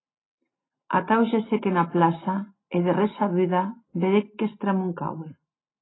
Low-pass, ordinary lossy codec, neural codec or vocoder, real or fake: 7.2 kHz; AAC, 16 kbps; none; real